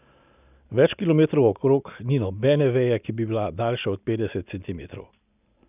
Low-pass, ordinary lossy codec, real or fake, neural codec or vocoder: 3.6 kHz; none; real; none